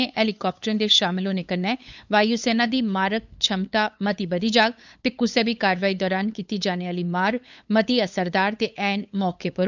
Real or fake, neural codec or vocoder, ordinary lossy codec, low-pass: fake; codec, 16 kHz, 8 kbps, FunCodec, trained on LibriTTS, 25 frames a second; none; 7.2 kHz